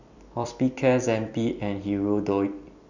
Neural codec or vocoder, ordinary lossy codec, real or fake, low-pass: vocoder, 44.1 kHz, 128 mel bands every 256 samples, BigVGAN v2; none; fake; 7.2 kHz